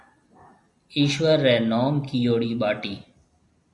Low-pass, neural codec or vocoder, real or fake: 10.8 kHz; none; real